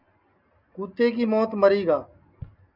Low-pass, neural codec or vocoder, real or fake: 5.4 kHz; none; real